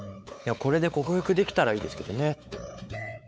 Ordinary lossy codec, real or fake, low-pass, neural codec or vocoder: none; fake; none; codec, 16 kHz, 4 kbps, X-Codec, WavLM features, trained on Multilingual LibriSpeech